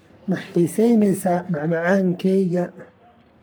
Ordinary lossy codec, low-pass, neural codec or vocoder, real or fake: none; none; codec, 44.1 kHz, 3.4 kbps, Pupu-Codec; fake